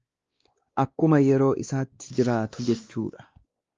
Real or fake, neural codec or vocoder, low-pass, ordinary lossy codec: fake; codec, 16 kHz, 2 kbps, X-Codec, WavLM features, trained on Multilingual LibriSpeech; 7.2 kHz; Opus, 32 kbps